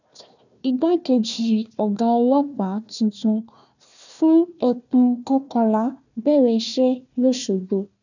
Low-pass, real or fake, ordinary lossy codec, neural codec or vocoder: 7.2 kHz; fake; none; codec, 16 kHz, 1 kbps, FunCodec, trained on Chinese and English, 50 frames a second